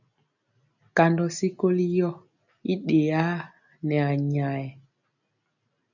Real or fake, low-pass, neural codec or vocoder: real; 7.2 kHz; none